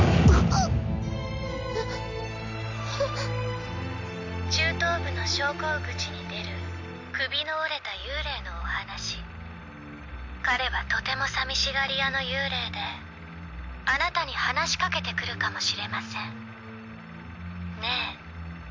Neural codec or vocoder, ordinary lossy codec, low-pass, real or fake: none; MP3, 64 kbps; 7.2 kHz; real